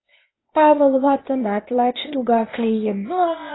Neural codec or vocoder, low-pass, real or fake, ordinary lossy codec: codec, 16 kHz, 0.8 kbps, ZipCodec; 7.2 kHz; fake; AAC, 16 kbps